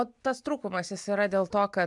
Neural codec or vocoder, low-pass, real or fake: none; 10.8 kHz; real